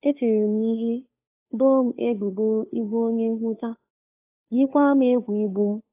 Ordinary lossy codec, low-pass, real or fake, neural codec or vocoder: AAC, 24 kbps; 3.6 kHz; fake; codec, 16 kHz, 2 kbps, FunCodec, trained on Chinese and English, 25 frames a second